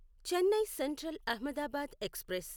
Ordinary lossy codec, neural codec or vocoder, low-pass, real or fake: none; none; none; real